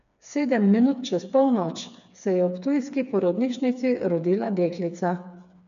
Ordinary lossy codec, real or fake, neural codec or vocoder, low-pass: none; fake; codec, 16 kHz, 4 kbps, FreqCodec, smaller model; 7.2 kHz